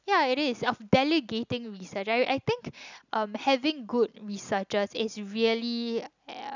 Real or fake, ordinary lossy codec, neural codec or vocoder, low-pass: real; none; none; 7.2 kHz